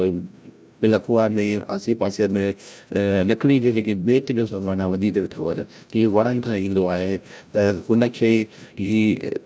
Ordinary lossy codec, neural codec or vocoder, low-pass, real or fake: none; codec, 16 kHz, 0.5 kbps, FreqCodec, larger model; none; fake